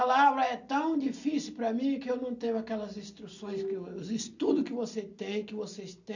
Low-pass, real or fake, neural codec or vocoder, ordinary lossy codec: 7.2 kHz; real; none; none